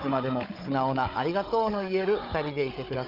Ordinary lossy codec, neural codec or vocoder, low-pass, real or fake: Opus, 24 kbps; codec, 16 kHz, 16 kbps, FunCodec, trained on Chinese and English, 50 frames a second; 5.4 kHz; fake